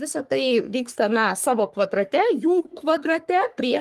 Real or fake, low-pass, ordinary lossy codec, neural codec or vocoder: fake; 14.4 kHz; Opus, 32 kbps; codec, 44.1 kHz, 3.4 kbps, Pupu-Codec